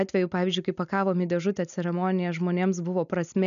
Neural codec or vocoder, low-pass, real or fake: none; 7.2 kHz; real